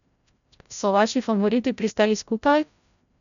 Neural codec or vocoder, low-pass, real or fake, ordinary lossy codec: codec, 16 kHz, 0.5 kbps, FreqCodec, larger model; 7.2 kHz; fake; none